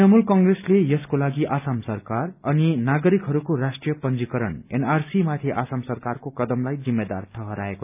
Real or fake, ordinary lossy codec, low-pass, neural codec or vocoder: real; none; 3.6 kHz; none